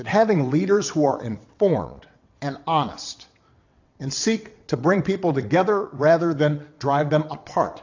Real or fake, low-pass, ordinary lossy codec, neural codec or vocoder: fake; 7.2 kHz; AAC, 48 kbps; vocoder, 22.05 kHz, 80 mel bands, WaveNeXt